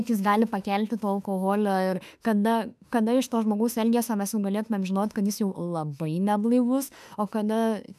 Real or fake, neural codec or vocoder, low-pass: fake; autoencoder, 48 kHz, 32 numbers a frame, DAC-VAE, trained on Japanese speech; 14.4 kHz